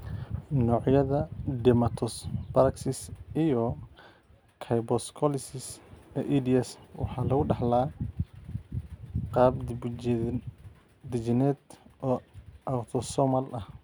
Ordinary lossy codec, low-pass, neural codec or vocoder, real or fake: none; none; none; real